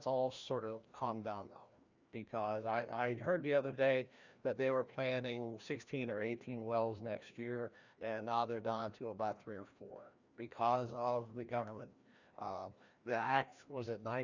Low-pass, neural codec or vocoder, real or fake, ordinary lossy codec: 7.2 kHz; codec, 16 kHz, 1 kbps, FreqCodec, larger model; fake; Opus, 64 kbps